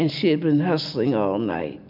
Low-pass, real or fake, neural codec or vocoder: 5.4 kHz; real; none